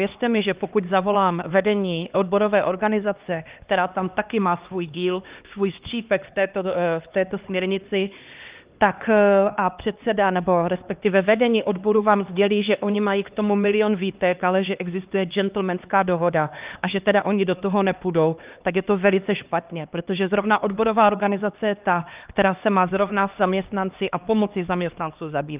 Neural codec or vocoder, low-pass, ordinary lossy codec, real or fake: codec, 16 kHz, 2 kbps, X-Codec, HuBERT features, trained on LibriSpeech; 3.6 kHz; Opus, 32 kbps; fake